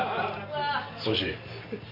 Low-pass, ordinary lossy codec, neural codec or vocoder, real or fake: 5.4 kHz; Opus, 64 kbps; none; real